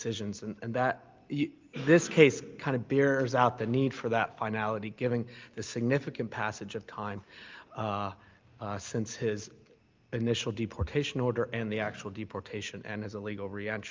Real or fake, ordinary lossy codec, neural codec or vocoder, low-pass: real; Opus, 24 kbps; none; 7.2 kHz